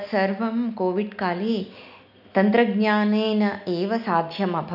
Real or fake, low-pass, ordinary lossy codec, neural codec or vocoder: real; 5.4 kHz; none; none